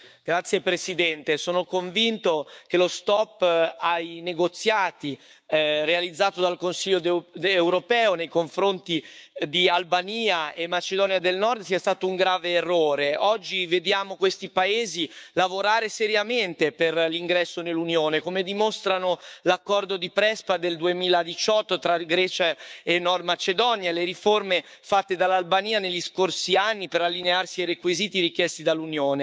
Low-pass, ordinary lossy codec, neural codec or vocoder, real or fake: none; none; codec, 16 kHz, 6 kbps, DAC; fake